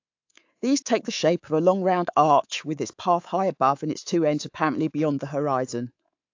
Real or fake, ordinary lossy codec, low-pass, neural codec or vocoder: fake; AAC, 48 kbps; 7.2 kHz; codec, 24 kHz, 3.1 kbps, DualCodec